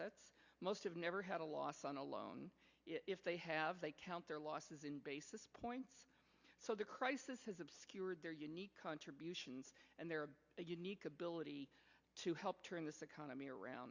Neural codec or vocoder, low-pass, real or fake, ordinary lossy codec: none; 7.2 kHz; real; Opus, 64 kbps